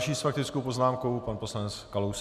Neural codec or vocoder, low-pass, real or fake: none; 14.4 kHz; real